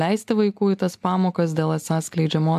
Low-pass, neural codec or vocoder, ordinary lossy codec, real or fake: 14.4 kHz; none; AAC, 96 kbps; real